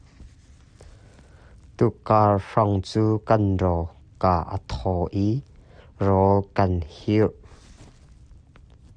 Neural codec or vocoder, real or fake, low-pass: none; real; 9.9 kHz